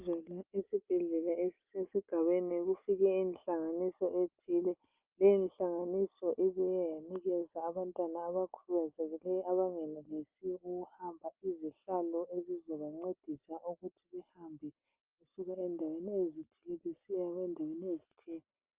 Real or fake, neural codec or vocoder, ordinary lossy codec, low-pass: real; none; Opus, 24 kbps; 3.6 kHz